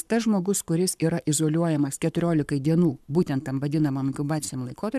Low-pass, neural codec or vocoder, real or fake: 14.4 kHz; codec, 44.1 kHz, 7.8 kbps, Pupu-Codec; fake